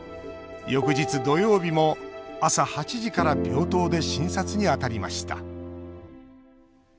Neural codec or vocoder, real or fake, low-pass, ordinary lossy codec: none; real; none; none